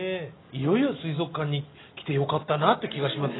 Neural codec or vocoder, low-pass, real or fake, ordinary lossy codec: none; 7.2 kHz; real; AAC, 16 kbps